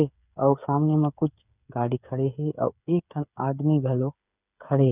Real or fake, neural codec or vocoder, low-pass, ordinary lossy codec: fake; codec, 16 kHz, 8 kbps, FreqCodec, smaller model; 3.6 kHz; none